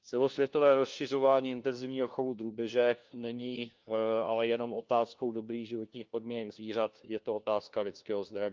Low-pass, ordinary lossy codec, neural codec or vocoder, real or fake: 7.2 kHz; Opus, 32 kbps; codec, 16 kHz, 1 kbps, FunCodec, trained on LibriTTS, 50 frames a second; fake